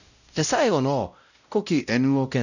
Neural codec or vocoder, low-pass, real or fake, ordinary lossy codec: codec, 16 kHz, 0.5 kbps, X-Codec, WavLM features, trained on Multilingual LibriSpeech; 7.2 kHz; fake; none